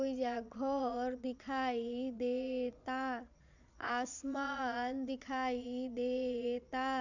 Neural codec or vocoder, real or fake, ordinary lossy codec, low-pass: vocoder, 22.05 kHz, 80 mel bands, Vocos; fake; none; 7.2 kHz